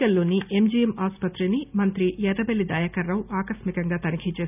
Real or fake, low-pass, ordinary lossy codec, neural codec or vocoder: real; 3.6 kHz; none; none